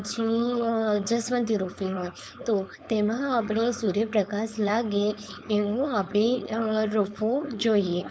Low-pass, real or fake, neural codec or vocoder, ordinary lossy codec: none; fake; codec, 16 kHz, 4.8 kbps, FACodec; none